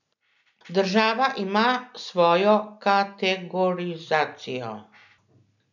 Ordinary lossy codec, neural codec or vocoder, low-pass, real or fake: none; none; 7.2 kHz; real